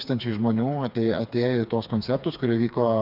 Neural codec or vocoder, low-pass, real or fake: codec, 16 kHz, 4 kbps, FreqCodec, smaller model; 5.4 kHz; fake